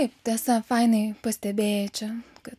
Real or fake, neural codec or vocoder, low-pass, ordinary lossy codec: real; none; 14.4 kHz; MP3, 96 kbps